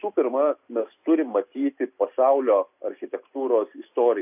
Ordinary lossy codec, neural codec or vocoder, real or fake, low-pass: AAC, 32 kbps; none; real; 3.6 kHz